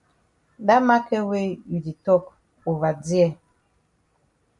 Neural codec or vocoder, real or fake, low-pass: none; real; 10.8 kHz